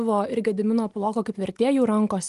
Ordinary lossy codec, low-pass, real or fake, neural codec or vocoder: Opus, 24 kbps; 10.8 kHz; real; none